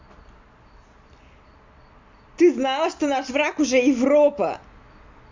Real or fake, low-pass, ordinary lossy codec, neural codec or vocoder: real; 7.2 kHz; MP3, 64 kbps; none